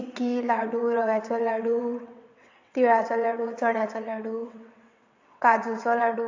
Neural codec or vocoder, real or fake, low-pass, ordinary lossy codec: vocoder, 22.05 kHz, 80 mel bands, WaveNeXt; fake; 7.2 kHz; AAC, 48 kbps